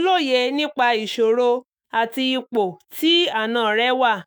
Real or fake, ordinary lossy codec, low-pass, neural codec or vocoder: fake; none; none; autoencoder, 48 kHz, 128 numbers a frame, DAC-VAE, trained on Japanese speech